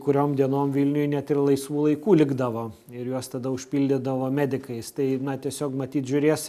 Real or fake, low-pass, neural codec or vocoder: real; 14.4 kHz; none